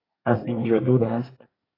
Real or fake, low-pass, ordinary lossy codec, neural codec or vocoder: fake; 5.4 kHz; AAC, 24 kbps; codec, 24 kHz, 1 kbps, SNAC